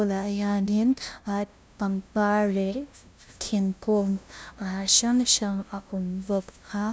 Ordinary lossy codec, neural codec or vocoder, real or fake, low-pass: none; codec, 16 kHz, 0.5 kbps, FunCodec, trained on LibriTTS, 25 frames a second; fake; none